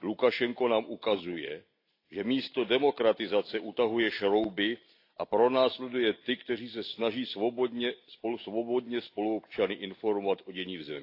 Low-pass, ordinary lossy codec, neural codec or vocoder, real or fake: 5.4 kHz; AAC, 32 kbps; none; real